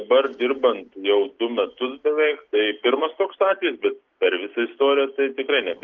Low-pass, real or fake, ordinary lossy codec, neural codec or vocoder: 7.2 kHz; real; Opus, 32 kbps; none